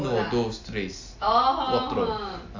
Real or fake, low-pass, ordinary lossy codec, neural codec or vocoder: real; 7.2 kHz; AAC, 48 kbps; none